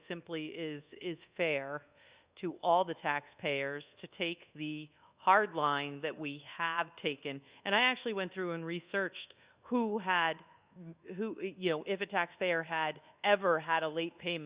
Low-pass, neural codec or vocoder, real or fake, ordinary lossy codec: 3.6 kHz; codec, 24 kHz, 1.2 kbps, DualCodec; fake; Opus, 64 kbps